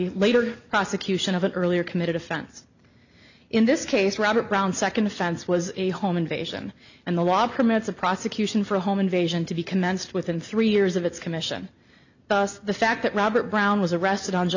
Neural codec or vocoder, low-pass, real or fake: none; 7.2 kHz; real